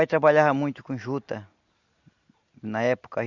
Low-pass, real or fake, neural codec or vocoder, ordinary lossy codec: 7.2 kHz; real; none; Opus, 64 kbps